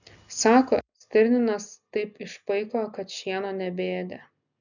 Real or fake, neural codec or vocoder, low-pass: real; none; 7.2 kHz